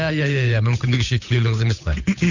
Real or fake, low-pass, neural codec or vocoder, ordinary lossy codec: fake; 7.2 kHz; codec, 16 kHz, 8 kbps, FunCodec, trained on Chinese and English, 25 frames a second; none